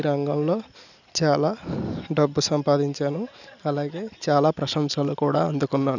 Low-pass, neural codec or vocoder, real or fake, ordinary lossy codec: 7.2 kHz; none; real; none